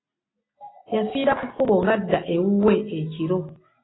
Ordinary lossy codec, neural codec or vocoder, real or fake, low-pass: AAC, 16 kbps; none; real; 7.2 kHz